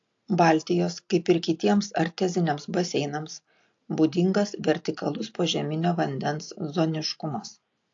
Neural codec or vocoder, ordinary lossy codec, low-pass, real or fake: none; AAC, 48 kbps; 7.2 kHz; real